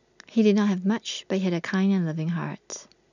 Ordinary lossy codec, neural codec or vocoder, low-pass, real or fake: none; none; 7.2 kHz; real